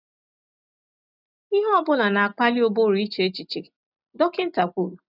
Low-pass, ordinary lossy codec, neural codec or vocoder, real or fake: 5.4 kHz; none; none; real